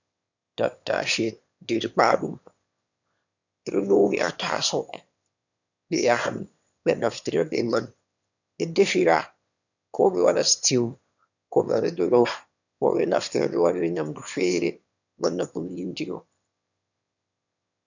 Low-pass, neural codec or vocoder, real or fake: 7.2 kHz; autoencoder, 22.05 kHz, a latent of 192 numbers a frame, VITS, trained on one speaker; fake